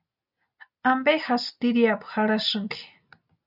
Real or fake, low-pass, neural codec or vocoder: real; 5.4 kHz; none